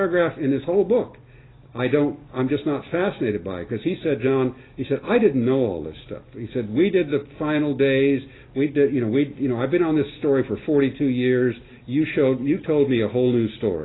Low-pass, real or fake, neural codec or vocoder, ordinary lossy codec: 7.2 kHz; real; none; AAC, 16 kbps